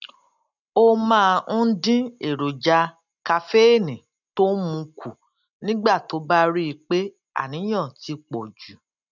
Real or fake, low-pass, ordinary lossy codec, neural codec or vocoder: real; 7.2 kHz; none; none